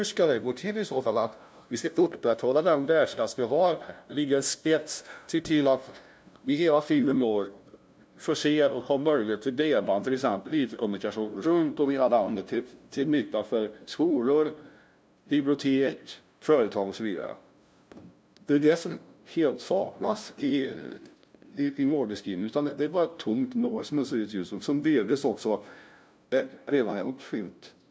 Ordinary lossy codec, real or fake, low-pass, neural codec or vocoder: none; fake; none; codec, 16 kHz, 0.5 kbps, FunCodec, trained on LibriTTS, 25 frames a second